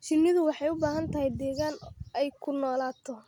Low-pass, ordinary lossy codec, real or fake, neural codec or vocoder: 19.8 kHz; none; real; none